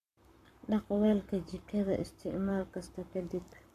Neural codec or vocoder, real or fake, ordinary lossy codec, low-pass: codec, 44.1 kHz, 7.8 kbps, Pupu-Codec; fake; MP3, 96 kbps; 14.4 kHz